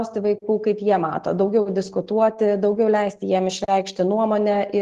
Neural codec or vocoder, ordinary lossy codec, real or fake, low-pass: none; Opus, 16 kbps; real; 14.4 kHz